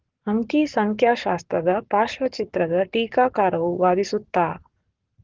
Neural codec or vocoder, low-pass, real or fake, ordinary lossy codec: codec, 44.1 kHz, 7.8 kbps, Pupu-Codec; 7.2 kHz; fake; Opus, 16 kbps